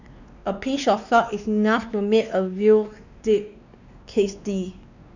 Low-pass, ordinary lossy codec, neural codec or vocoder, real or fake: 7.2 kHz; none; codec, 16 kHz, 2 kbps, X-Codec, WavLM features, trained on Multilingual LibriSpeech; fake